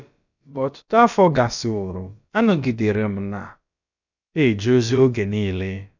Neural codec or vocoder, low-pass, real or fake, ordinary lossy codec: codec, 16 kHz, about 1 kbps, DyCAST, with the encoder's durations; 7.2 kHz; fake; none